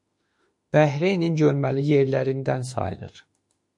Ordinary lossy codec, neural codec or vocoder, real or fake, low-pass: MP3, 48 kbps; autoencoder, 48 kHz, 32 numbers a frame, DAC-VAE, trained on Japanese speech; fake; 10.8 kHz